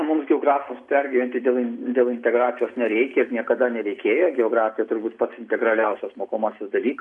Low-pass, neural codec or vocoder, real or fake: 10.8 kHz; none; real